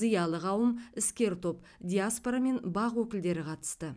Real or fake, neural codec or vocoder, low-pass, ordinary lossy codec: real; none; none; none